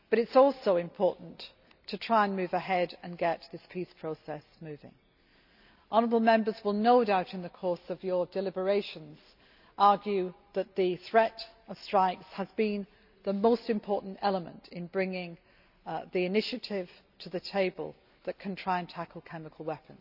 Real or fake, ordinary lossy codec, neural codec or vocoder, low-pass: real; none; none; 5.4 kHz